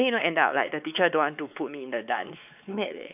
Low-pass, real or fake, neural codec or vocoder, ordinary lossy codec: 3.6 kHz; fake; codec, 16 kHz, 4 kbps, X-Codec, WavLM features, trained on Multilingual LibriSpeech; none